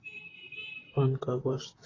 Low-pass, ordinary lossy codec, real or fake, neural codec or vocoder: 7.2 kHz; AAC, 32 kbps; fake; vocoder, 44.1 kHz, 128 mel bands, Pupu-Vocoder